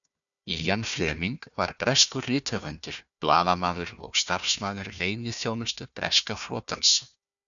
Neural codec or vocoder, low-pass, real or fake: codec, 16 kHz, 1 kbps, FunCodec, trained on Chinese and English, 50 frames a second; 7.2 kHz; fake